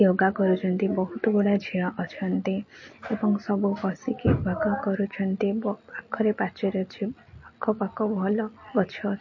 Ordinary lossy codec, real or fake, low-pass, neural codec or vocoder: MP3, 32 kbps; real; 7.2 kHz; none